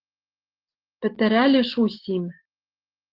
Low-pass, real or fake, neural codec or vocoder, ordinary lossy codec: 5.4 kHz; real; none; Opus, 16 kbps